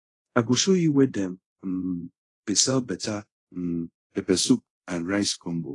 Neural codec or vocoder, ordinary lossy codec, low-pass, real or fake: codec, 24 kHz, 0.5 kbps, DualCodec; AAC, 32 kbps; 10.8 kHz; fake